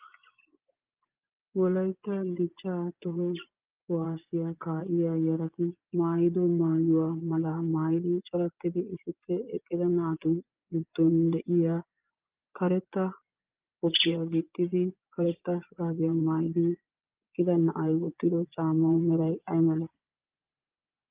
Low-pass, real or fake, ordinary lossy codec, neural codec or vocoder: 3.6 kHz; fake; Opus, 24 kbps; vocoder, 24 kHz, 100 mel bands, Vocos